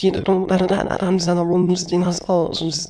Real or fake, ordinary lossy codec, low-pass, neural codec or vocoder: fake; none; none; autoencoder, 22.05 kHz, a latent of 192 numbers a frame, VITS, trained on many speakers